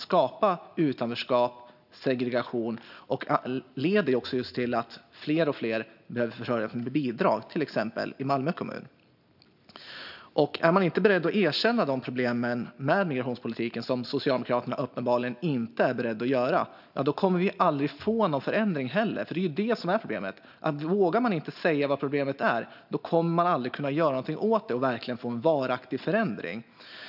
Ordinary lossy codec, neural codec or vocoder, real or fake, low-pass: none; none; real; 5.4 kHz